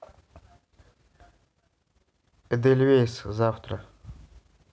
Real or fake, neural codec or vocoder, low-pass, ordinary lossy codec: real; none; none; none